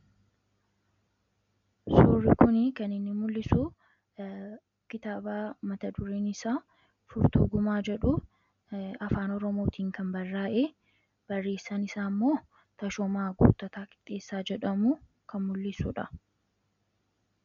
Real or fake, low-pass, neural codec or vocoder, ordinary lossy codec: real; 7.2 kHz; none; MP3, 64 kbps